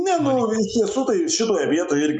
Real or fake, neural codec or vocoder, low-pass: real; none; 10.8 kHz